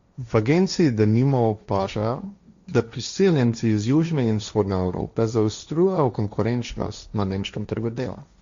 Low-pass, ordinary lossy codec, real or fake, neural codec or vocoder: 7.2 kHz; Opus, 64 kbps; fake; codec, 16 kHz, 1.1 kbps, Voila-Tokenizer